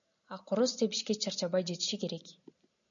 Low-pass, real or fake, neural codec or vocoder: 7.2 kHz; real; none